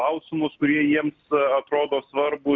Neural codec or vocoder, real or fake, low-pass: none; real; 7.2 kHz